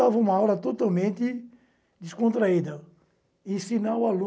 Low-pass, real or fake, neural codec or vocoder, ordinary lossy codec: none; real; none; none